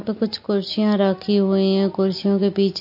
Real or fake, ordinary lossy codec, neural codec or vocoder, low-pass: real; MP3, 32 kbps; none; 5.4 kHz